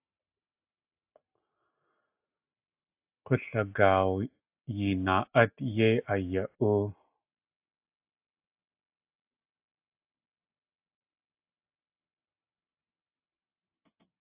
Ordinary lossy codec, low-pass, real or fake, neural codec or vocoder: AAC, 32 kbps; 3.6 kHz; real; none